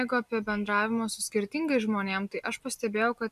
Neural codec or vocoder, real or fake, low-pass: none; real; 14.4 kHz